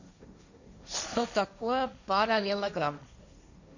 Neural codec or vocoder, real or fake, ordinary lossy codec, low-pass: codec, 16 kHz, 1.1 kbps, Voila-Tokenizer; fake; none; 7.2 kHz